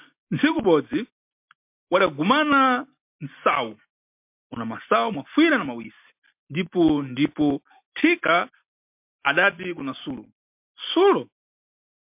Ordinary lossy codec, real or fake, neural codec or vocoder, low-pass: MP3, 32 kbps; real; none; 3.6 kHz